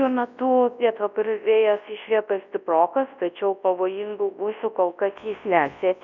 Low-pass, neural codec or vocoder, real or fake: 7.2 kHz; codec, 24 kHz, 0.9 kbps, WavTokenizer, large speech release; fake